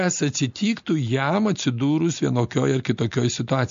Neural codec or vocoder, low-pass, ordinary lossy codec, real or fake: none; 7.2 kHz; MP3, 48 kbps; real